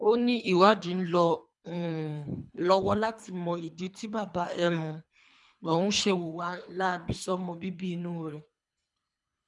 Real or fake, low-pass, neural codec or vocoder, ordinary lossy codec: fake; 10.8 kHz; codec, 24 kHz, 3 kbps, HILCodec; none